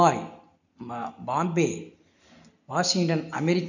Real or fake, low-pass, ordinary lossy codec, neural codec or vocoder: real; 7.2 kHz; none; none